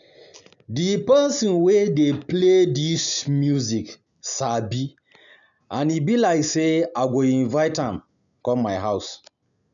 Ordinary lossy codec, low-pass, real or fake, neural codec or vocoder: none; 7.2 kHz; real; none